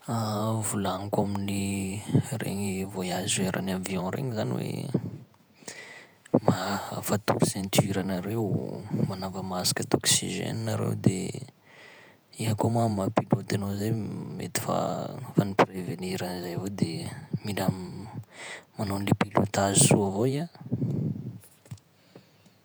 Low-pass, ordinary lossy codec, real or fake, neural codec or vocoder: none; none; fake; vocoder, 48 kHz, 128 mel bands, Vocos